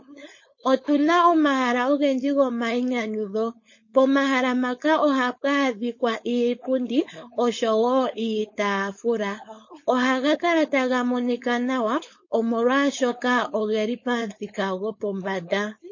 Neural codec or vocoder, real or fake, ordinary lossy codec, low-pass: codec, 16 kHz, 4.8 kbps, FACodec; fake; MP3, 32 kbps; 7.2 kHz